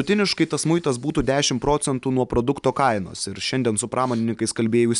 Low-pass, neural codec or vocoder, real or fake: 10.8 kHz; none; real